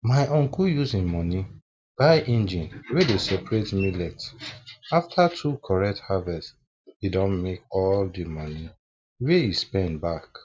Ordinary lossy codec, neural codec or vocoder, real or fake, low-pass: none; none; real; none